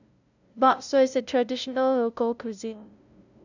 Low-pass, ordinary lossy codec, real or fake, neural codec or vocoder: 7.2 kHz; none; fake; codec, 16 kHz, 0.5 kbps, FunCodec, trained on LibriTTS, 25 frames a second